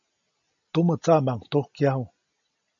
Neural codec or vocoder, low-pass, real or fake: none; 7.2 kHz; real